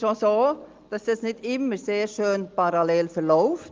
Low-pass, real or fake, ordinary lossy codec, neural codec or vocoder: 7.2 kHz; real; Opus, 32 kbps; none